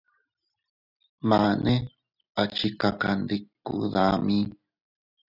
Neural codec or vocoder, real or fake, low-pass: none; real; 5.4 kHz